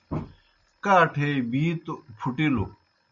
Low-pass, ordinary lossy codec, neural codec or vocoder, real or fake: 7.2 kHz; MP3, 64 kbps; none; real